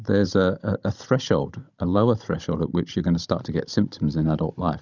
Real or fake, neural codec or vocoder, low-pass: fake; codec, 16 kHz, 16 kbps, FunCodec, trained on Chinese and English, 50 frames a second; 7.2 kHz